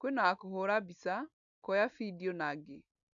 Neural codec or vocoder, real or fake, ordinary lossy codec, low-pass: none; real; none; 7.2 kHz